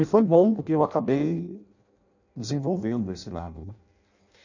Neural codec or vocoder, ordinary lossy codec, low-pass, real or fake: codec, 16 kHz in and 24 kHz out, 0.6 kbps, FireRedTTS-2 codec; none; 7.2 kHz; fake